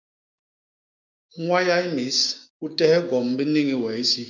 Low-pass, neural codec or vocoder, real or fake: 7.2 kHz; codec, 16 kHz, 6 kbps, DAC; fake